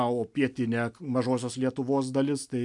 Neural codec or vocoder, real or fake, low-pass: none; real; 10.8 kHz